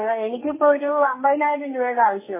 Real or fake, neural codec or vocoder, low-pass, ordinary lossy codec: fake; codec, 44.1 kHz, 2.6 kbps, SNAC; 3.6 kHz; MP3, 16 kbps